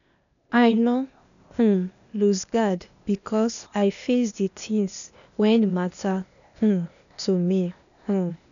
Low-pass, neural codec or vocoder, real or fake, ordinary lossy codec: 7.2 kHz; codec, 16 kHz, 0.8 kbps, ZipCodec; fake; none